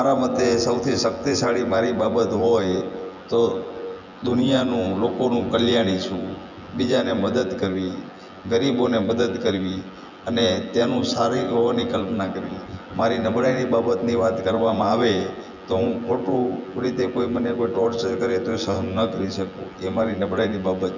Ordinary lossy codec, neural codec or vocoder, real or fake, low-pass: none; vocoder, 24 kHz, 100 mel bands, Vocos; fake; 7.2 kHz